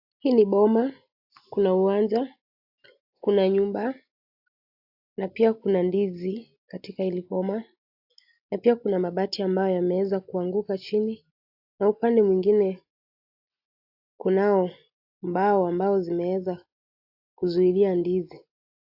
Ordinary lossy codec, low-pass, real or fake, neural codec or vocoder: AAC, 48 kbps; 5.4 kHz; real; none